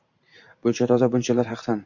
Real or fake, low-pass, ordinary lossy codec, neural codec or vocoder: fake; 7.2 kHz; MP3, 48 kbps; vocoder, 44.1 kHz, 128 mel bands every 512 samples, BigVGAN v2